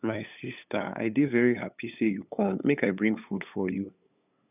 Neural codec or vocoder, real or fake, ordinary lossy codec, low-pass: codec, 16 kHz, 8 kbps, FunCodec, trained on LibriTTS, 25 frames a second; fake; none; 3.6 kHz